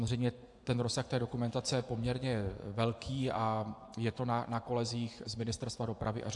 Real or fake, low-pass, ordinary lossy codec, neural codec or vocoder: fake; 10.8 kHz; AAC, 64 kbps; vocoder, 24 kHz, 100 mel bands, Vocos